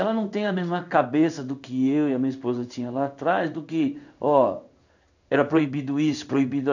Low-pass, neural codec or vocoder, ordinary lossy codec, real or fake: 7.2 kHz; codec, 16 kHz in and 24 kHz out, 1 kbps, XY-Tokenizer; none; fake